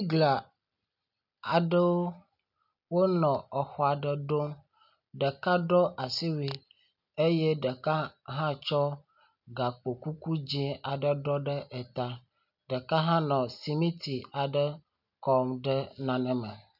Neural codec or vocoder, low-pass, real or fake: none; 5.4 kHz; real